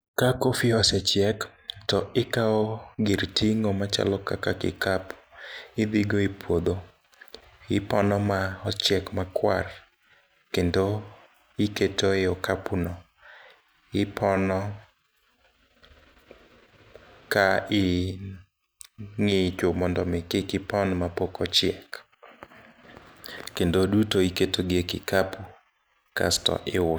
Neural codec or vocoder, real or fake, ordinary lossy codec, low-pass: none; real; none; none